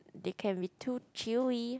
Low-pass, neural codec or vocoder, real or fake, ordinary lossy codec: none; none; real; none